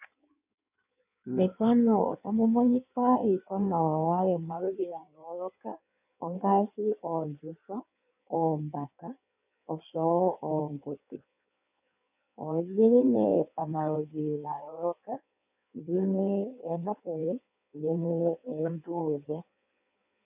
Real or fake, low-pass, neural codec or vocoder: fake; 3.6 kHz; codec, 16 kHz in and 24 kHz out, 1.1 kbps, FireRedTTS-2 codec